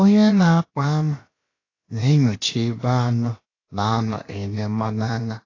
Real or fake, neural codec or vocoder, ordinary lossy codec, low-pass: fake; codec, 16 kHz, about 1 kbps, DyCAST, with the encoder's durations; AAC, 32 kbps; 7.2 kHz